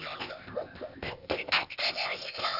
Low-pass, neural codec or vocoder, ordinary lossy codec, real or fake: 5.4 kHz; codec, 16 kHz, 0.8 kbps, ZipCodec; none; fake